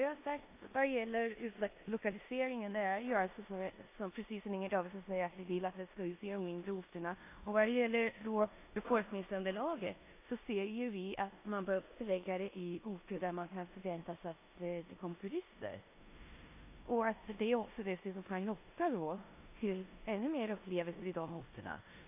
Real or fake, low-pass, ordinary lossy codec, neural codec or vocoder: fake; 3.6 kHz; AAC, 24 kbps; codec, 16 kHz in and 24 kHz out, 0.9 kbps, LongCat-Audio-Codec, four codebook decoder